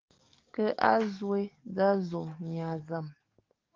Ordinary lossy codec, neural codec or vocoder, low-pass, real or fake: Opus, 24 kbps; codec, 44.1 kHz, 7.8 kbps, DAC; 7.2 kHz; fake